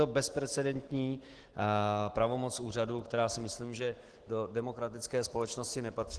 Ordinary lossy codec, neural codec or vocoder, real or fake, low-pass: Opus, 16 kbps; autoencoder, 48 kHz, 128 numbers a frame, DAC-VAE, trained on Japanese speech; fake; 10.8 kHz